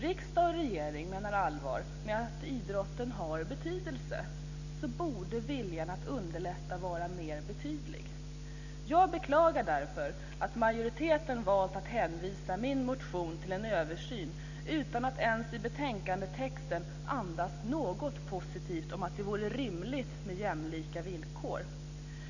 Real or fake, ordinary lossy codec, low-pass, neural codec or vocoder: real; none; 7.2 kHz; none